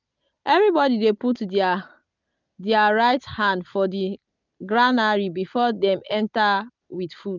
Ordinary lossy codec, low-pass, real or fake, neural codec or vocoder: none; 7.2 kHz; real; none